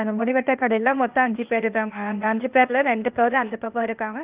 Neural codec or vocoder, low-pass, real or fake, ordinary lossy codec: codec, 16 kHz, 0.8 kbps, ZipCodec; 3.6 kHz; fake; Opus, 32 kbps